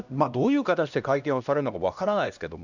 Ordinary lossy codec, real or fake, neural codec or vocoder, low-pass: none; fake; codec, 16 kHz, 1 kbps, X-Codec, HuBERT features, trained on LibriSpeech; 7.2 kHz